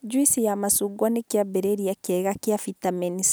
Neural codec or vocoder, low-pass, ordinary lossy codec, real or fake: none; none; none; real